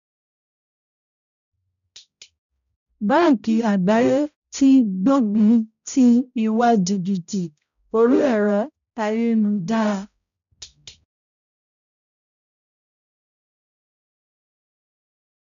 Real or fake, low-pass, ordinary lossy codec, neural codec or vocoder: fake; 7.2 kHz; none; codec, 16 kHz, 0.5 kbps, X-Codec, HuBERT features, trained on balanced general audio